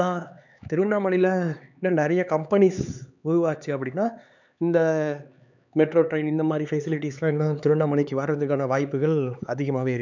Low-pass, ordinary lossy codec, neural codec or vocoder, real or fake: 7.2 kHz; none; codec, 16 kHz, 4 kbps, X-Codec, HuBERT features, trained on LibriSpeech; fake